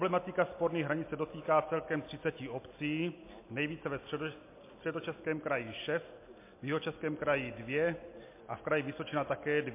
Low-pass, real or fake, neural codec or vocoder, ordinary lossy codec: 3.6 kHz; real; none; MP3, 24 kbps